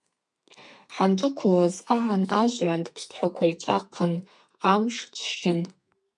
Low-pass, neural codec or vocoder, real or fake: 10.8 kHz; codec, 32 kHz, 1.9 kbps, SNAC; fake